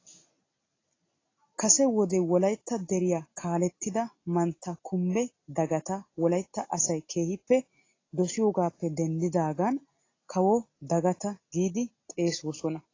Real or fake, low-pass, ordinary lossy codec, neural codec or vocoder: real; 7.2 kHz; AAC, 32 kbps; none